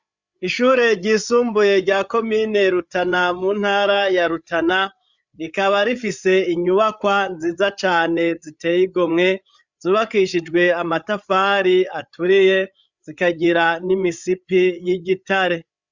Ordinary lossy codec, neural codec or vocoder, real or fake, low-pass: Opus, 64 kbps; codec, 16 kHz, 8 kbps, FreqCodec, larger model; fake; 7.2 kHz